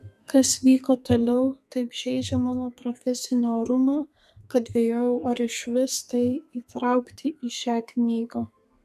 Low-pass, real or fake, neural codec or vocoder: 14.4 kHz; fake; codec, 32 kHz, 1.9 kbps, SNAC